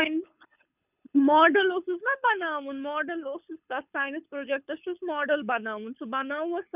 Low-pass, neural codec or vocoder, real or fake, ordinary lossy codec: 3.6 kHz; codec, 24 kHz, 6 kbps, HILCodec; fake; none